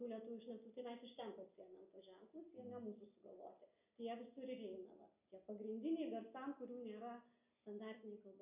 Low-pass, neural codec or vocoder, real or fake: 3.6 kHz; none; real